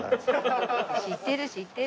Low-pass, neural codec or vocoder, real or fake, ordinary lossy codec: none; none; real; none